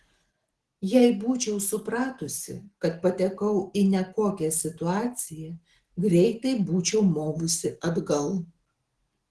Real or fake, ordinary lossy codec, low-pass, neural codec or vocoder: real; Opus, 16 kbps; 10.8 kHz; none